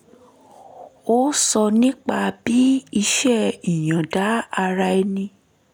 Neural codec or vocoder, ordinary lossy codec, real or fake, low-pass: none; none; real; none